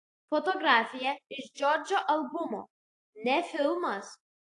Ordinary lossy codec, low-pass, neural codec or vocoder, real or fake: AAC, 48 kbps; 10.8 kHz; none; real